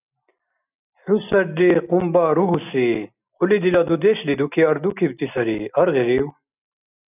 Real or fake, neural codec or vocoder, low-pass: real; none; 3.6 kHz